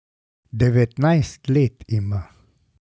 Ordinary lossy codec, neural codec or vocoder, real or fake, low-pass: none; none; real; none